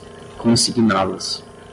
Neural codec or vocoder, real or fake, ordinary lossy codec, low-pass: none; real; MP3, 64 kbps; 10.8 kHz